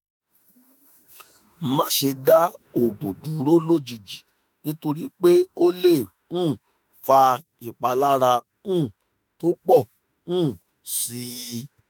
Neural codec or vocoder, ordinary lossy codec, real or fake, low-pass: autoencoder, 48 kHz, 32 numbers a frame, DAC-VAE, trained on Japanese speech; none; fake; none